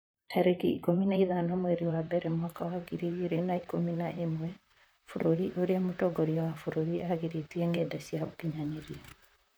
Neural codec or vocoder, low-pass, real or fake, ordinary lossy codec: vocoder, 44.1 kHz, 128 mel bands, Pupu-Vocoder; none; fake; none